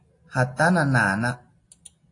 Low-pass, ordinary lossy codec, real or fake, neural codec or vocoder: 10.8 kHz; AAC, 48 kbps; real; none